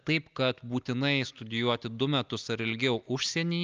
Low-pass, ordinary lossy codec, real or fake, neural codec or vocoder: 7.2 kHz; Opus, 24 kbps; real; none